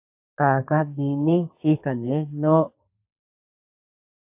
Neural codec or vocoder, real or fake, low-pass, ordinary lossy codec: codec, 32 kHz, 1.9 kbps, SNAC; fake; 3.6 kHz; AAC, 32 kbps